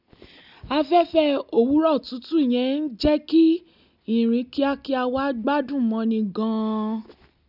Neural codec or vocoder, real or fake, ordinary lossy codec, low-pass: none; real; none; 5.4 kHz